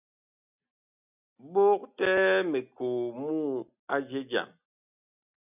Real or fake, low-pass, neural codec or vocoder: real; 3.6 kHz; none